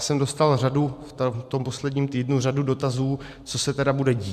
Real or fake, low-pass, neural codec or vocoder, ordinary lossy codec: fake; 14.4 kHz; vocoder, 44.1 kHz, 128 mel bands every 512 samples, BigVGAN v2; MP3, 96 kbps